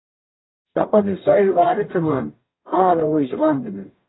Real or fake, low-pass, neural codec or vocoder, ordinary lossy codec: fake; 7.2 kHz; codec, 44.1 kHz, 0.9 kbps, DAC; AAC, 16 kbps